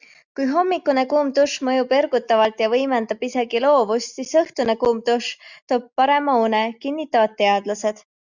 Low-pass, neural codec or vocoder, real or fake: 7.2 kHz; none; real